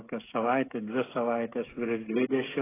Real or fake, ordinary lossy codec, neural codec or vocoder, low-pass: fake; AAC, 16 kbps; codec, 16 kHz, 16 kbps, FreqCodec, smaller model; 3.6 kHz